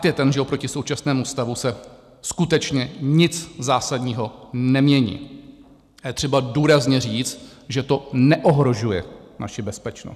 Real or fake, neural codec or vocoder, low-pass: real; none; 14.4 kHz